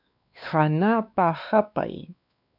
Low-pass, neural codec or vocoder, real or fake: 5.4 kHz; codec, 16 kHz, 2 kbps, X-Codec, WavLM features, trained on Multilingual LibriSpeech; fake